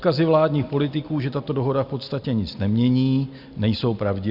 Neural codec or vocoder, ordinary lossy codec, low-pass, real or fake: none; Opus, 64 kbps; 5.4 kHz; real